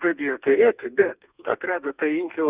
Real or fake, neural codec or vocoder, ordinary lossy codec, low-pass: fake; codec, 32 kHz, 1.9 kbps, SNAC; Opus, 64 kbps; 3.6 kHz